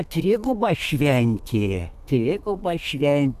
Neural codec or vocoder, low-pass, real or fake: codec, 32 kHz, 1.9 kbps, SNAC; 14.4 kHz; fake